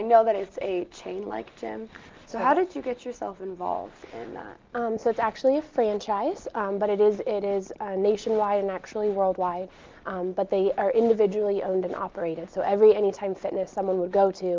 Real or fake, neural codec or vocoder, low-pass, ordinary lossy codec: real; none; 7.2 kHz; Opus, 24 kbps